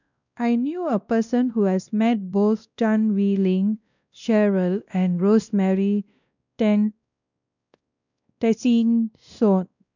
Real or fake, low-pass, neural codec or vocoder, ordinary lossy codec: fake; 7.2 kHz; codec, 16 kHz, 1 kbps, X-Codec, WavLM features, trained on Multilingual LibriSpeech; none